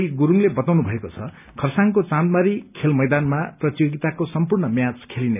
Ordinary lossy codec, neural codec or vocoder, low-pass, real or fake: none; none; 3.6 kHz; real